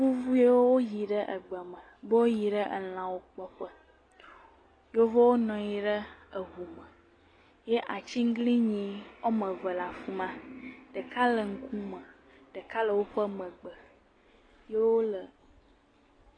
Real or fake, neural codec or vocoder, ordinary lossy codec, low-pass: real; none; AAC, 32 kbps; 9.9 kHz